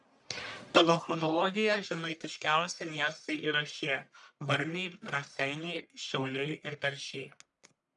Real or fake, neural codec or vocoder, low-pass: fake; codec, 44.1 kHz, 1.7 kbps, Pupu-Codec; 10.8 kHz